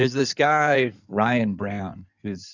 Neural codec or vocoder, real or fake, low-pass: codec, 16 kHz in and 24 kHz out, 2.2 kbps, FireRedTTS-2 codec; fake; 7.2 kHz